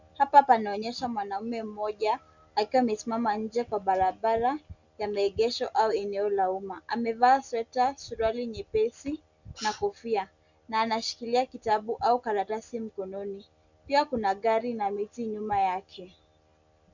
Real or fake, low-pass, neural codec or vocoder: real; 7.2 kHz; none